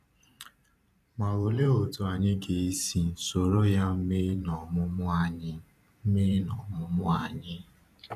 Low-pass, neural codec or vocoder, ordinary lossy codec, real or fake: 14.4 kHz; vocoder, 44.1 kHz, 128 mel bands every 512 samples, BigVGAN v2; none; fake